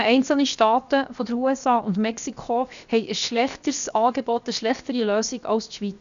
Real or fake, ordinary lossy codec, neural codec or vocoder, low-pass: fake; AAC, 96 kbps; codec, 16 kHz, 0.7 kbps, FocalCodec; 7.2 kHz